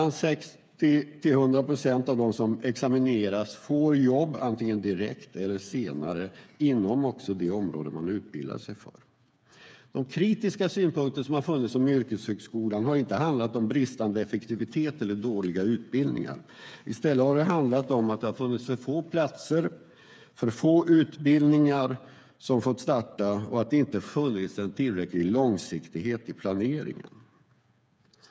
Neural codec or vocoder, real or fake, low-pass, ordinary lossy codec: codec, 16 kHz, 8 kbps, FreqCodec, smaller model; fake; none; none